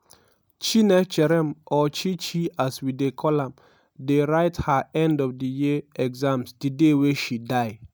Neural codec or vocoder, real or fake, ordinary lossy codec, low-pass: none; real; none; none